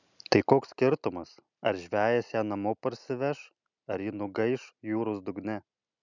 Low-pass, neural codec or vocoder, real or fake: 7.2 kHz; none; real